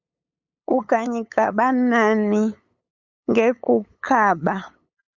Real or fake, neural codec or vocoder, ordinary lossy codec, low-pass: fake; codec, 16 kHz, 8 kbps, FunCodec, trained on LibriTTS, 25 frames a second; Opus, 64 kbps; 7.2 kHz